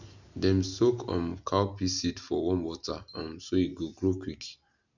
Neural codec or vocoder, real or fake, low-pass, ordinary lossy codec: none; real; 7.2 kHz; none